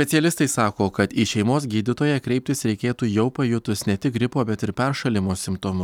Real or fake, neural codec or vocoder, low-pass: real; none; 19.8 kHz